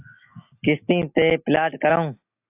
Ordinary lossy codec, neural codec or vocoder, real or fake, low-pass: AAC, 32 kbps; none; real; 3.6 kHz